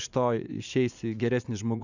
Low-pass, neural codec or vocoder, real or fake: 7.2 kHz; vocoder, 44.1 kHz, 80 mel bands, Vocos; fake